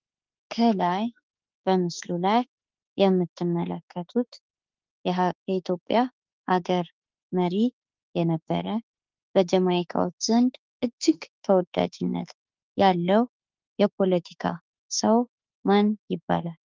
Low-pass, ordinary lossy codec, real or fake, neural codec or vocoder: 7.2 kHz; Opus, 24 kbps; fake; autoencoder, 48 kHz, 32 numbers a frame, DAC-VAE, trained on Japanese speech